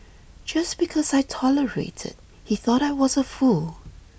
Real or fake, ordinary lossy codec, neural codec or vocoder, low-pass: real; none; none; none